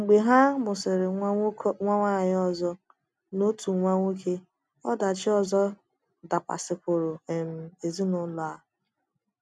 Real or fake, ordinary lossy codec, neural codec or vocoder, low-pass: real; none; none; none